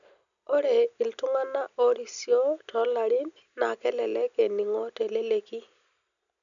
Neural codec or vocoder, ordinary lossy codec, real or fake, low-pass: none; none; real; 7.2 kHz